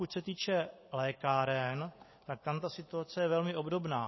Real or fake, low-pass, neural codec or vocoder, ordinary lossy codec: real; 7.2 kHz; none; MP3, 24 kbps